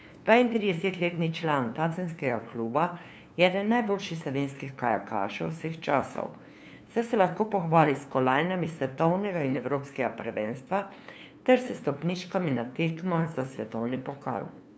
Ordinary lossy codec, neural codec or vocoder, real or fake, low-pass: none; codec, 16 kHz, 2 kbps, FunCodec, trained on LibriTTS, 25 frames a second; fake; none